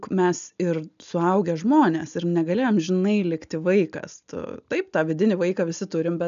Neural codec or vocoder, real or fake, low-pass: none; real; 7.2 kHz